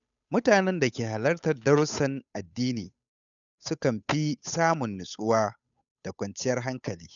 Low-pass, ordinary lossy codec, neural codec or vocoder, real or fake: 7.2 kHz; none; codec, 16 kHz, 8 kbps, FunCodec, trained on Chinese and English, 25 frames a second; fake